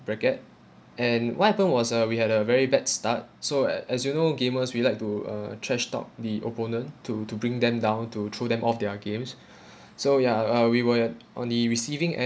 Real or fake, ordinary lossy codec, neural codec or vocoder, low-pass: real; none; none; none